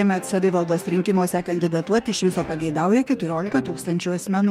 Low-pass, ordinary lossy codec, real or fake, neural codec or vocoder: 19.8 kHz; MP3, 96 kbps; fake; codec, 44.1 kHz, 2.6 kbps, DAC